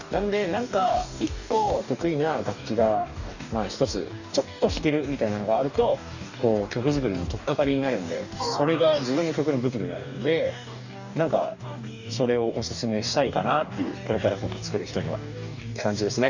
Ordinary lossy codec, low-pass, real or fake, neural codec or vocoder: none; 7.2 kHz; fake; codec, 44.1 kHz, 2.6 kbps, DAC